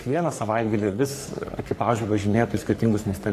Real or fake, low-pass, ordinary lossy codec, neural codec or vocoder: fake; 14.4 kHz; AAC, 64 kbps; codec, 44.1 kHz, 3.4 kbps, Pupu-Codec